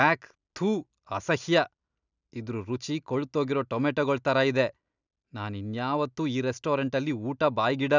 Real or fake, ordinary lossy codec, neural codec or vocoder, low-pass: real; none; none; 7.2 kHz